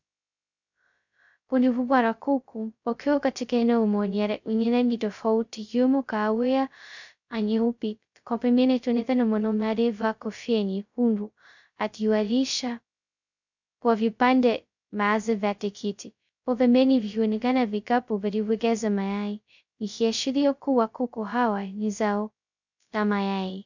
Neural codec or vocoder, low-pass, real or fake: codec, 16 kHz, 0.2 kbps, FocalCodec; 7.2 kHz; fake